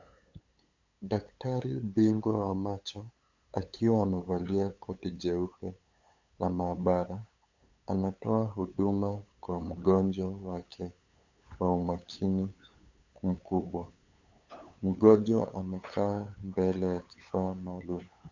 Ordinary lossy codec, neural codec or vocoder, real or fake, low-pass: MP3, 64 kbps; codec, 16 kHz, 8 kbps, FunCodec, trained on LibriTTS, 25 frames a second; fake; 7.2 kHz